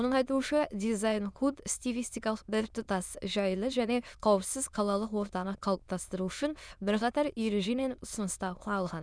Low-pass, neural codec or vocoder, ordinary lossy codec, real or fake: none; autoencoder, 22.05 kHz, a latent of 192 numbers a frame, VITS, trained on many speakers; none; fake